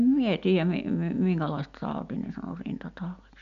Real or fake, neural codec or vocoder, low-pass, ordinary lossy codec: real; none; 7.2 kHz; none